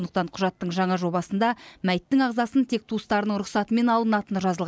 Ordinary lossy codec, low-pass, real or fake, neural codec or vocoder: none; none; real; none